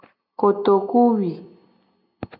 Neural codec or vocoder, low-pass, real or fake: none; 5.4 kHz; real